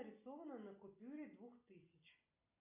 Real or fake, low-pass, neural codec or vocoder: real; 3.6 kHz; none